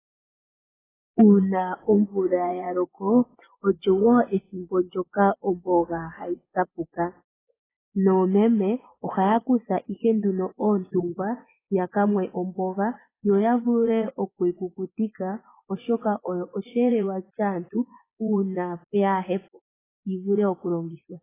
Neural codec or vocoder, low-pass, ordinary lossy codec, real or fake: vocoder, 24 kHz, 100 mel bands, Vocos; 3.6 kHz; AAC, 16 kbps; fake